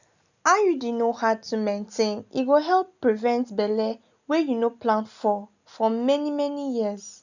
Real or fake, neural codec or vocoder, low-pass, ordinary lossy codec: real; none; 7.2 kHz; none